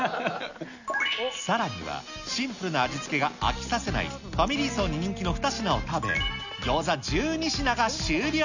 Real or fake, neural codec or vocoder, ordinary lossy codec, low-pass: real; none; none; 7.2 kHz